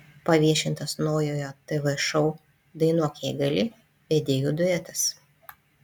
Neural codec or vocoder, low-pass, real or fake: none; 19.8 kHz; real